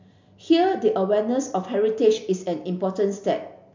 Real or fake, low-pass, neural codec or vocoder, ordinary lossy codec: real; 7.2 kHz; none; MP3, 64 kbps